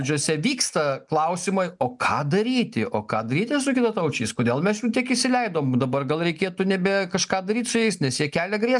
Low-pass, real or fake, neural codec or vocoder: 10.8 kHz; real; none